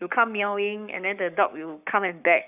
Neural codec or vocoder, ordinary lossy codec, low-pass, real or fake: codec, 44.1 kHz, 7.8 kbps, Pupu-Codec; none; 3.6 kHz; fake